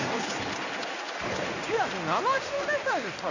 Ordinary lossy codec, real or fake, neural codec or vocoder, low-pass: none; fake; codec, 16 kHz in and 24 kHz out, 1 kbps, XY-Tokenizer; 7.2 kHz